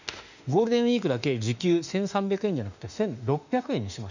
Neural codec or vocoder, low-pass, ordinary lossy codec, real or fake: autoencoder, 48 kHz, 32 numbers a frame, DAC-VAE, trained on Japanese speech; 7.2 kHz; none; fake